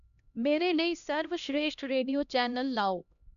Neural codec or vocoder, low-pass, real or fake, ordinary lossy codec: codec, 16 kHz, 1 kbps, X-Codec, HuBERT features, trained on LibriSpeech; 7.2 kHz; fake; none